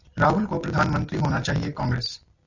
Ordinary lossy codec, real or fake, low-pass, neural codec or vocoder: Opus, 64 kbps; real; 7.2 kHz; none